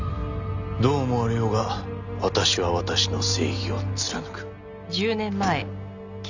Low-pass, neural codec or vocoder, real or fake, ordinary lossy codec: 7.2 kHz; none; real; none